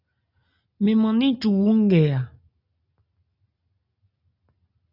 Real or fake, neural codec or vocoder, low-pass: real; none; 5.4 kHz